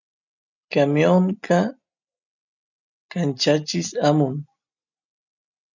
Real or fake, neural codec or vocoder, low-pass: real; none; 7.2 kHz